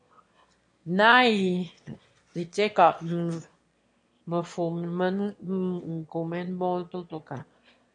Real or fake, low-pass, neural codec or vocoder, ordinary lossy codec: fake; 9.9 kHz; autoencoder, 22.05 kHz, a latent of 192 numbers a frame, VITS, trained on one speaker; MP3, 48 kbps